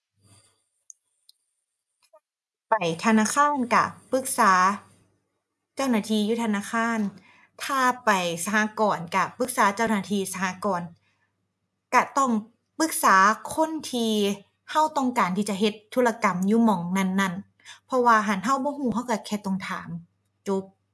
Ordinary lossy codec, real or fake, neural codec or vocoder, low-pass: none; real; none; none